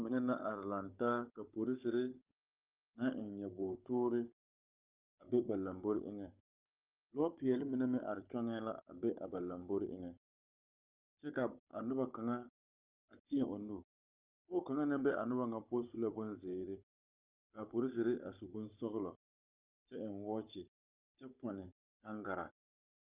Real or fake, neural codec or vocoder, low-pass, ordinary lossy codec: fake; codec, 16 kHz, 16 kbps, FunCodec, trained on Chinese and English, 50 frames a second; 3.6 kHz; Opus, 32 kbps